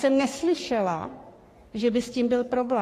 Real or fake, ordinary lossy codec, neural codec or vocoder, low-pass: fake; AAC, 48 kbps; codec, 44.1 kHz, 3.4 kbps, Pupu-Codec; 14.4 kHz